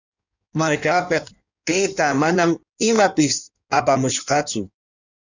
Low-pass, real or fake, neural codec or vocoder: 7.2 kHz; fake; codec, 16 kHz in and 24 kHz out, 1.1 kbps, FireRedTTS-2 codec